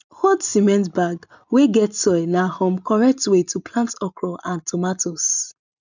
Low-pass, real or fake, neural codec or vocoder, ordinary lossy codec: 7.2 kHz; real; none; none